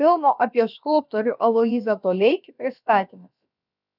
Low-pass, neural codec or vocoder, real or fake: 5.4 kHz; codec, 16 kHz, about 1 kbps, DyCAST, with the encoder's durations; fake